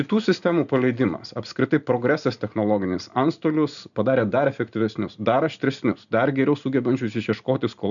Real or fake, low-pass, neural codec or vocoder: real; 7.2 kHz; none